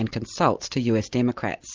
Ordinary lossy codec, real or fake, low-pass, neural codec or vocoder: Opus, 32 kbps; real; 7.2 kHz; none